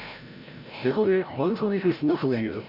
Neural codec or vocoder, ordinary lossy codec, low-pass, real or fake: codec, 16 kHz, 0.5 kbps, FreqCodec, larger model; none; 5.4 kHz; fake